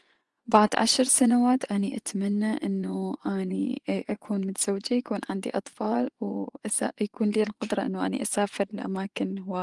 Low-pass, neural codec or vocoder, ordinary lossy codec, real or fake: 10.8 kHz; vocoder, 24 kHz, 100 mel bands, Vocos; Opus, 24 kbps; fake